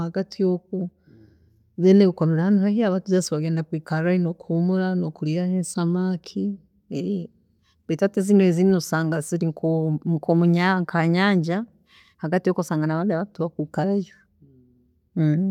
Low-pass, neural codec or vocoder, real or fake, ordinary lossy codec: 19.8 kHz; none; real; none